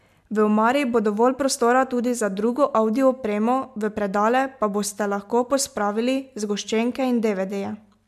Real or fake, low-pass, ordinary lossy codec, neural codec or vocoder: real; 14.4 kHz; MP3, 96 kbps; none